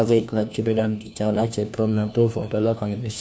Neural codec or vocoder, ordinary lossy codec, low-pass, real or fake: codec, 16 kHz, 1 kbps, FunCodec, trained on LibriTTS, 50 frames a second; none; none; fake